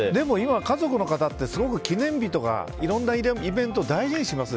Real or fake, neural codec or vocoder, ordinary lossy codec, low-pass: real; none; none; none